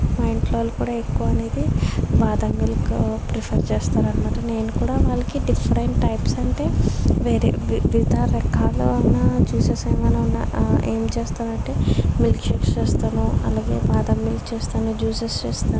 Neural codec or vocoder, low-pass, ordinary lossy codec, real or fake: none; none; none; real